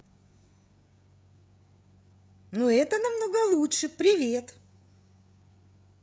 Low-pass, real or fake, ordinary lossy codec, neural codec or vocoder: none; fake; none; codec, 16 kHz, 16 kbps, FreqCodec, smaller model